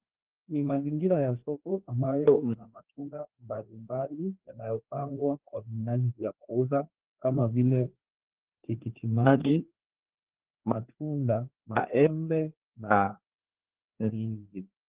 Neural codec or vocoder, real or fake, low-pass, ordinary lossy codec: codec, 16 kHz, 2 kbps, FreqCodec, larger model; fake; 3.6 kHz; Opus, 16 kbps